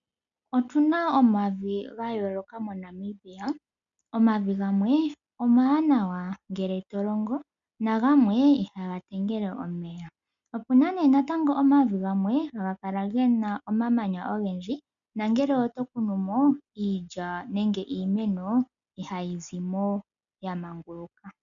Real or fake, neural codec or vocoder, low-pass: real; none; 7.2 kHz